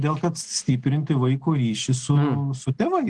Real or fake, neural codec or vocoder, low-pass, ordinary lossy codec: real; none; 9.9 kHz; Opus, 16 kbps